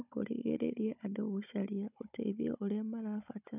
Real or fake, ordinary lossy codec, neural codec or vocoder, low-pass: fake; none; codec, 16 kHz, 16 kbps, FunCodec, trained on Chinese and English, 50 frames a second; 3.6 kHz